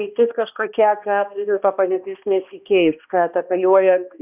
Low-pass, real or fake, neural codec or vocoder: 3.6 kHz; fake; codec, 16 kHz, 1 kbps, X-Codec, HuBERT features, trained on balanced general audio